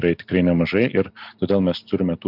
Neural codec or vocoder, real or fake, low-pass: none; real; 5.4 kHz